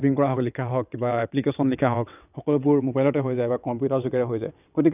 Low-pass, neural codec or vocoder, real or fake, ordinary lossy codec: 3.6 kHz; vocoder, 22.05 kHz, 80 mel bands, WaveNeXt; fake; none